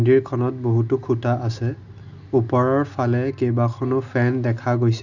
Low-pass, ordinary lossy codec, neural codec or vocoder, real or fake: 7.2 kHz; none; none; real